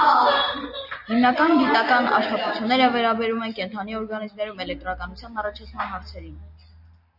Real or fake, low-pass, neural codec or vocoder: real; 5.4 kHz; none